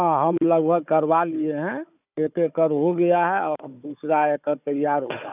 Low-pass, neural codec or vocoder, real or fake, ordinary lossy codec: 3.6 kHz; codec, 16 kHz, 4 kbps, FunCodec, trained on Chinese and English, 50 frames a second; fake; none